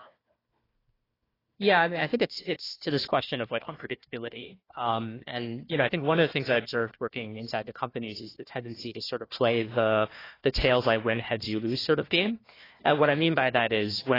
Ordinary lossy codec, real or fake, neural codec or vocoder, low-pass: AAC, 24 kbps; fake; codec, 16 kHz, 1 kbps, FunCodec, trained on Chinese and English, 50 frames a second; 5.4 kHz